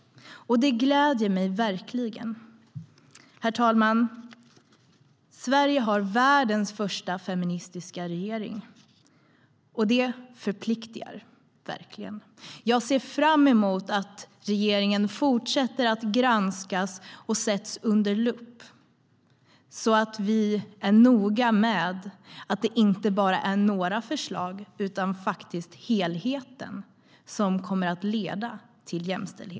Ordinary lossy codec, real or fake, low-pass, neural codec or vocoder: none; real; none; none